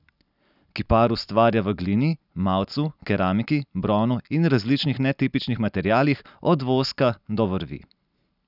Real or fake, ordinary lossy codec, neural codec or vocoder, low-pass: real; none; none; 5.4 kHz